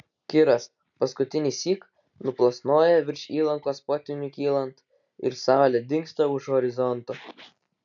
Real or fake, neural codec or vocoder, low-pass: real; none; 7.2 kHz